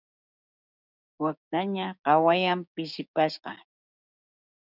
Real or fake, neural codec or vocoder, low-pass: fake; codec, 16 kHz, 6 kbps, DAC; 5.4 kHz